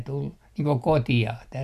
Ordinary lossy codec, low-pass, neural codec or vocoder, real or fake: none; 14.4 kHz; none; real